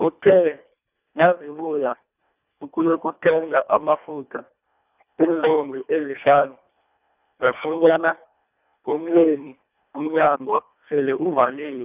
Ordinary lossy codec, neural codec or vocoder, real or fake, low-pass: none; codec, 24 kHz, 1.5 kbps, HILCodec; fake; 3.6 kHz